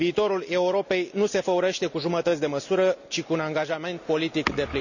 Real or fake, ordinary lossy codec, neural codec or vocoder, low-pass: real; none; none; 7.2 kHz